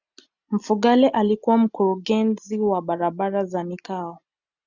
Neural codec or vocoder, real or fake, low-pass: none; real; 7.2 kHz